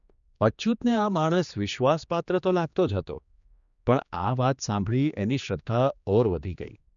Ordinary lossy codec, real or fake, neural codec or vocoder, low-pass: none; fake; codec, 16 kHz, 2 kbps, X-Codec, HuBERT features, trained on general audio; 7.2 kHz